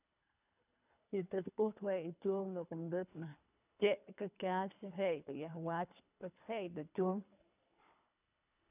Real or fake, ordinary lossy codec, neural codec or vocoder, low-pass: fake; none; codec, 24 kHz, 3 kbps, HILCodec; 3.6 kHz